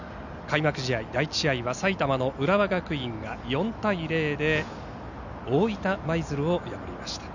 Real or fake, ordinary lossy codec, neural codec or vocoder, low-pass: real; none; none; 7.2 kHz